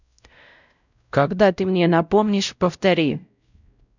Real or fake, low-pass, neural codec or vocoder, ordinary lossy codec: fake; 7.2 kHz; codec, 16 kHz, 0.5 kbps, X-Codec, WavLM features, trained on Multilingual LibriSpeech; none